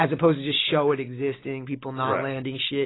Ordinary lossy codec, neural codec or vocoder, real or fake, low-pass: AAC, 16 kbps; none; real; 7.2 kHz